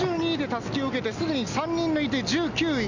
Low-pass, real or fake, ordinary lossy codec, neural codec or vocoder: 7.2 kHz; real; none; none